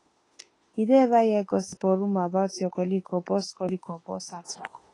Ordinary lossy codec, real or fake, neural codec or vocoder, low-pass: AAC, 32 kbps; fake; autoencoder, 48 kHz, 32 numbers a frame, DAC-VAE, trained on Japanese speech; 10.8 kHz